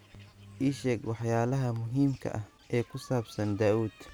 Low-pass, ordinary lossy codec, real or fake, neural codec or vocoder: none; none; real; none